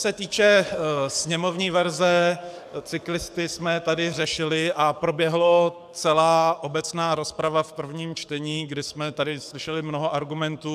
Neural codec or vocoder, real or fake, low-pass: codec, 44.1 kHz, 7.8 kbps, DAC; fake; 14.4 kHz